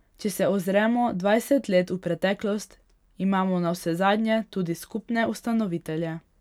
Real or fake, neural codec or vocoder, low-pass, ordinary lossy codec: real; none; 19.8 kHz; none